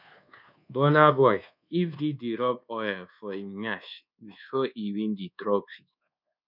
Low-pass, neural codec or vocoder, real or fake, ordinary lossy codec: 5.4 kHz; codec, 24 kHz, 1.2 kbps, DualCodec; fake; none